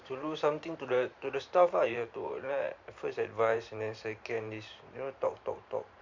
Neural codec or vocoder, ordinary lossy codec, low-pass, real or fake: vocoder, 44.1 kHz, 128 mel bands every 512 samples, BigVGAN v2; MP3, 48 kbps; 7.2 kHz; fake